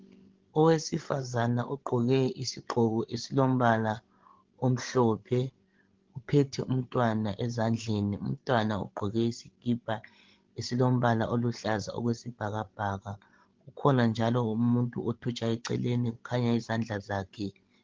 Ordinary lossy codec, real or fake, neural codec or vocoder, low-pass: Opus, 16 kbps; fake; codec, 16 kHz, 16 kbps, FreqCodec, larger model; 7.2 kHz